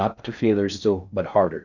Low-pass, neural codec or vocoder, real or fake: 7.2 kHz; codec, 16 kHz in and 24 kHz out, 0.6 kbps, FocalCodec, streaming, 4096 codes; fake